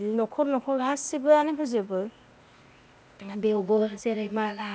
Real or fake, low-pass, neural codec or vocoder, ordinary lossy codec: fake; none; codec, 16 kHz, 0.8 kbps, ZipCodec; none